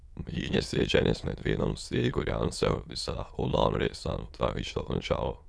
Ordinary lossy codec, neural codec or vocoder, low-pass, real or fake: none; autoencoder, 22.05 kHz, a latent of 192 numbers a frame, VITS, trained on many speakers; none; fake